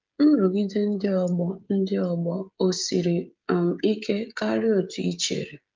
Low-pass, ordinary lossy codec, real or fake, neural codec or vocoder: 7.2 kHz; Opus, 32 kbps; fake; codec, 16 kHz, 16 kbps, FreqCodec, smaller model